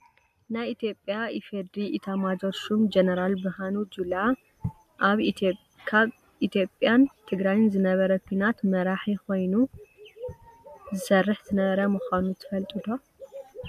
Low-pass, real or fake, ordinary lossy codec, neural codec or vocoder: 14.4 kHz; real; MP3, 96 kbps; none